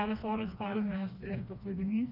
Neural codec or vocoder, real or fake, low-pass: codec, 16 kHz, 2 kbps, FreqCodec, smaller model; fake; 5.4 kHz